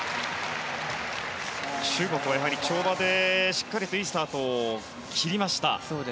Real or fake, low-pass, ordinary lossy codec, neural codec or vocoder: real; none; none; none